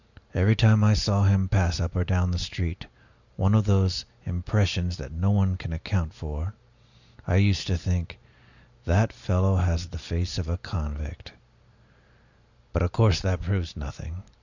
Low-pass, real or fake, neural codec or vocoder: 7.2 kHz; real; none